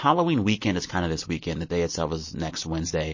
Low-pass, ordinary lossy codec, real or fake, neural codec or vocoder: 7.2 kHz; MP3, 32 kbps; real; none